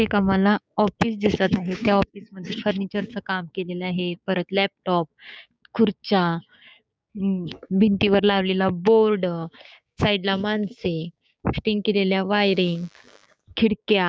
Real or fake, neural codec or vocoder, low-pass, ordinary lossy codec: fake; codec, 16 kHz, 6 kbps, DAC; none; none